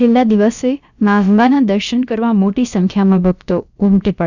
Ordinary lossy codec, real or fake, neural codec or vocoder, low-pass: none; fake; codec, 16 kHz, about 1 kbps, DyCAST, with the encoder's durations; 7.2 kHz